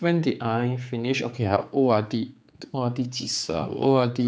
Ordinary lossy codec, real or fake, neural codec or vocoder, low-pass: none; fake; codec, 16 kHz, 4 kbps, X-Codec, HuBERT features, trained on general audio; none